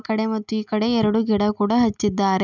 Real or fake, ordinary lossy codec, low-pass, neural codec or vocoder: real; none; 7.2 kHz; none